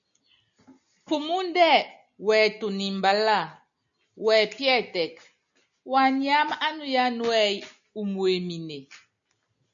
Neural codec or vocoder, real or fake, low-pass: none; real; 7.2 kHz